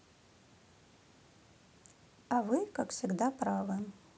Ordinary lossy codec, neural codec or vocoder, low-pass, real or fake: none; none; none; real